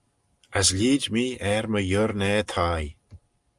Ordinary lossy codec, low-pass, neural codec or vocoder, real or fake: Opus, 32 kbps; 10.8 kHz; none; real